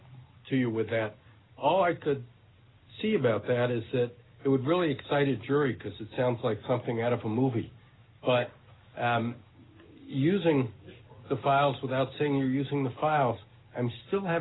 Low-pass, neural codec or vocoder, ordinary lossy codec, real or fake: 7.2 kHz; none; AAC, 16 kbps; real